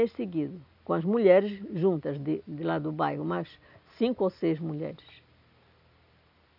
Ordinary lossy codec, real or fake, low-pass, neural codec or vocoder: none; real; 5.4 kHz; none